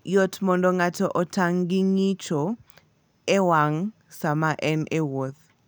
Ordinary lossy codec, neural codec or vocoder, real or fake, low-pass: none; none; real; none